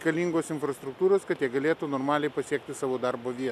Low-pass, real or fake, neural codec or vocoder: 14.4 kHz; real; none